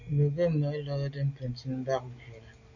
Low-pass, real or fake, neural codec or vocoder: 7.2 kHz; real; none